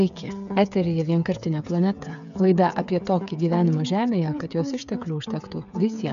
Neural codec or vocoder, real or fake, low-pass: codec, 16 kHz, 8 kbps, FreqCodec, smaller model; fake; 7.2 kHz